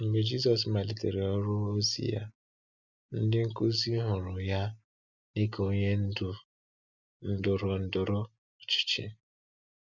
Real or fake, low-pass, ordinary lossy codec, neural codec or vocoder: real; 7.2 kHz; none; none